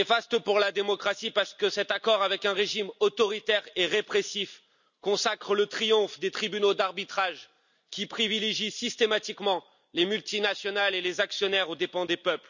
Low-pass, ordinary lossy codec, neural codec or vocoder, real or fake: 7.2 kHz; none; none; real